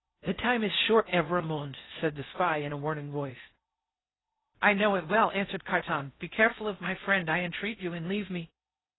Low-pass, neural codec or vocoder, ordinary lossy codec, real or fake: 7.2 kHz; codec, 16 kHz in and 24 kHz out, 0.6 kbps, FocalCodec, streaming, 4096 codes; AAC, 16 kbps; fake